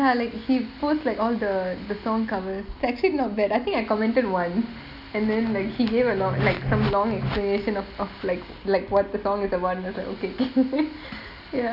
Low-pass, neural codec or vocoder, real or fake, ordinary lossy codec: 5.4 kHz; none; real; none